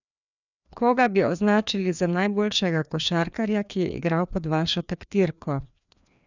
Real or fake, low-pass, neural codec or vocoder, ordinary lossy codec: fake; 7.2 kHz; codec, 16 kHz, 2 kbps, FreqCodec, larger model; none